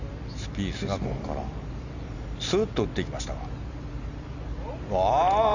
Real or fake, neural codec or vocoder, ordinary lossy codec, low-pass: real; none; none; 7.2 kHz